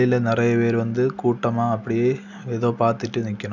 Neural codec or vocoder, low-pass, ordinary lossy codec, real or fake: none; 7.2 kHz; none; real